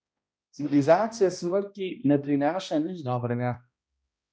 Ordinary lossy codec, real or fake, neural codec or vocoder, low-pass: none; fake; codec, 16 kHz, 1 kbps, X-Codec, HuBERT features, trained on balanced general audio; none